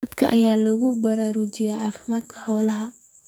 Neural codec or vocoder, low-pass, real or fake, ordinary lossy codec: codec, 44.1 kHz, 2.6 kbps, SNAC; none; fake; none